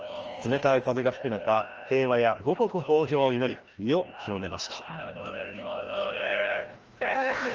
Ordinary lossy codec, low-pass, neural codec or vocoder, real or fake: Opus, 24 kbps; 7.2 kHz; codec, 16 kHz, 1 kbps, FreqCodec, larger model; fake